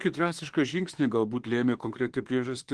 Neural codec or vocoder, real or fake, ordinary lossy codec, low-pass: autoencoder, 48 kHz, 32 numbers a frame, DAC-VAE, trained on Japanese speech; fake; Opus, 16 kbps; 10.8 kHz